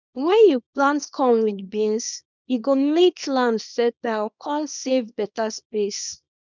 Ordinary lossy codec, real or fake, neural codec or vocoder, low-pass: none; fake; codec, 24 kHz, 0.9 kbps, WavTokenizer, small release; 7.2 kHz